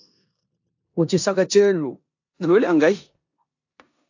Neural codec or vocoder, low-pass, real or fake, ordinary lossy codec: codec, 16 kHz in and 24 kHz out, 0.9 kbps, LongCat-Audio-Codec, four codebook decoder; 7.2 kHz; fake; AAC, 48 kbps